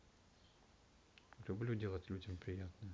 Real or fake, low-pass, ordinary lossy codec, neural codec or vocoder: real; none; none; none